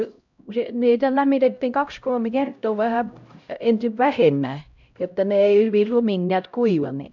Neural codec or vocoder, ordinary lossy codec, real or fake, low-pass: codec, 16 kHz, 0.5 kbps, X-Codec, HuBERT features, trained on LibriSpeech; none; fake; 7.2 kHz